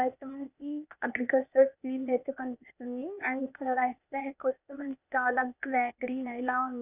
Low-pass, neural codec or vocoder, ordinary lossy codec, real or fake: 3.6 kHz; codec, 16 kHz, 0.8 kbps, ZipCodec; none; fake